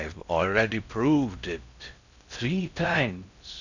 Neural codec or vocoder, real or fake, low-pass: codec, 16 kHz in and 24 kHz out, 0.6 kbps, FocalCodec, streaming, 4096 codes; fake; 7.2 kHz